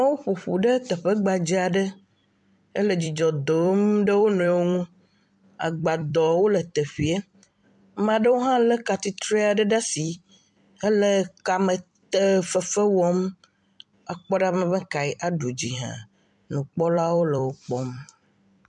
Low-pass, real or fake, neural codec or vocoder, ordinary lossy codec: 10.8 kHz; fake; vocoder, 44.1 kHz, 128 mel bands every 256 samples, BigVGAN v2; MP3, 96 kbps